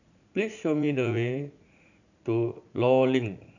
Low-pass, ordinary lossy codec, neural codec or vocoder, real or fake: 7.2 kHz; none; vocoder, 44.1 kHz, 80 mel bands, Vocos; fake